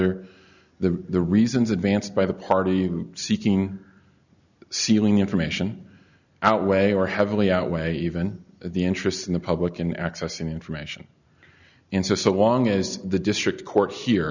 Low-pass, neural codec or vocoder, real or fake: 7.2 kHz; none; real